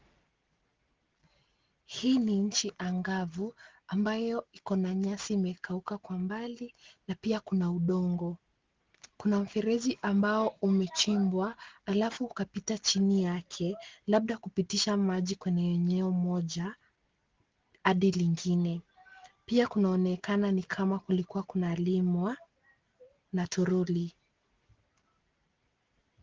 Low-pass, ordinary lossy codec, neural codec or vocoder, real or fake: 7.2 kHz; Opus, 16 kbps; none; real